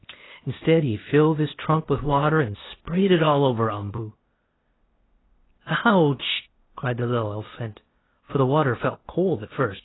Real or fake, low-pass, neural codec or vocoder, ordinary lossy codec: fake; 7.2 kHz; codec, 16 kHz, 0.8 kbps, ZipCodec; AAC, 16 kbps